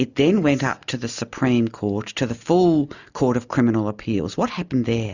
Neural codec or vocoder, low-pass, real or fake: none; 7.2 kHz; real